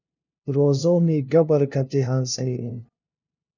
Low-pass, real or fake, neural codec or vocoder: 7.2 kHz; fake; codec, 16 kHz, 0.5 kbps, FunCodec, trained on LibriTTS, 25 frames a second